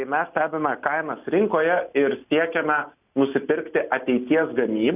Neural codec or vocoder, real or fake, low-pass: none; real; 3.6 kHz